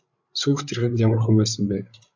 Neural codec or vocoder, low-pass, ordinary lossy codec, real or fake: codec, 16 kHz, 16 kbps, FreqCodec, larger model; 7.2 kHz; AAC, 48 kbps; fake